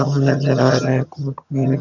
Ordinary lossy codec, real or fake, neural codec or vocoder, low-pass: none; fake; vocoder, 22.05 kHz, 80 mel bands, HiFi-GAN; 7.2 kHz